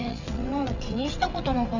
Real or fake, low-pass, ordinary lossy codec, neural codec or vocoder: fake; 7.2 kHz; none; vocoder, 22.05 kHz, 80 mel bands, WaveNeXt